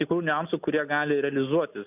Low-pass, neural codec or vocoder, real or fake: 3.6 kHz; none; real